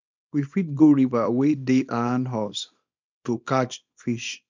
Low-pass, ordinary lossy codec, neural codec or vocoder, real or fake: 7.2 kHz; MP3, 64 kbps; codec, 24 kHz, 0.9 kbps, WavTokenizer, small release; fake